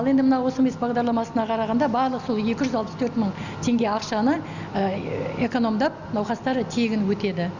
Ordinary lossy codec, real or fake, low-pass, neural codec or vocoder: none; real; 7.2 kHz; none